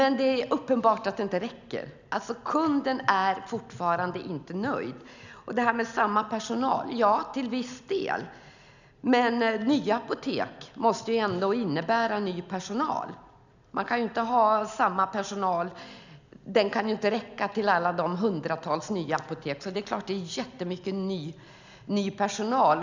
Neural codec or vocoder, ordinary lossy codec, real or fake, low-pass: none; none; real; 7.2 kHz